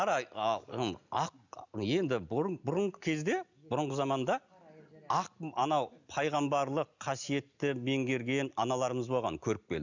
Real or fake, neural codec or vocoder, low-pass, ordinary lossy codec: real; none; 7.2 kHz; none